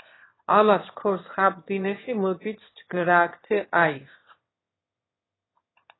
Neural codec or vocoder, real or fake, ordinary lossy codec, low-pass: autoencoder, 22.05 kHz, a latent of 192 numbers a frame, VITS, trained on one speaker; fake; AAC, 16 kbps; 7.2 kHz